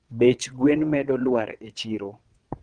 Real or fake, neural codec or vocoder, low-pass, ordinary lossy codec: fake; vocoder, 22.05 kHz, 80 mel bands, WaveNeXt; 9.9 kHz; Opus, 24 kbps